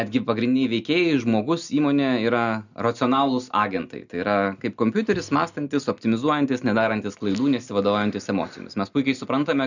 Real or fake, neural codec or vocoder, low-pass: real; none; 7.2 kHz